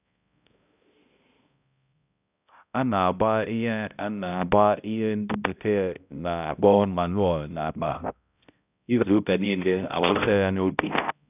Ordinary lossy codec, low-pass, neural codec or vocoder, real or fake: none; 3.6 kHz; codec, 16 kHz, 0.5 kbps, X-Codec, HuBERT features, trained on balanced general audio; fake